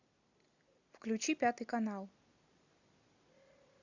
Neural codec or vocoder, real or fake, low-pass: none; real; 7.2 kHz